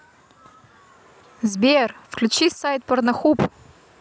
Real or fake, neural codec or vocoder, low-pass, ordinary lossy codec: real; none; none; none